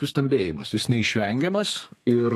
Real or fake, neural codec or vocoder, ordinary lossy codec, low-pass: fake; codec, 32 kHz, 1.9 kbps, SNAC; AAC, 64 kbps; 14.4 kHz